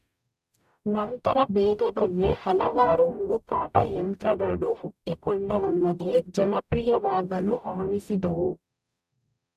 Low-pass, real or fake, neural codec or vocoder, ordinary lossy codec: 14.4 kHz; fake; codec, 44.1 kHz, 0.9 kbps, DAC; none